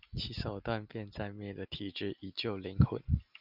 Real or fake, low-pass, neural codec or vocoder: real; 5.4 kHz; none